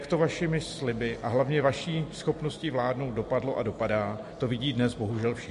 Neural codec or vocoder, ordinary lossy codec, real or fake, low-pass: none; MP3, 48 kbps; real; 14.4 kHz